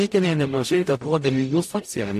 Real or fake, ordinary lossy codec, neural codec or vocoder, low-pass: fake; AAC, 64 kbps; codec, 44.1 kHz, 0.9 kbps, DAC; 14.4 kHz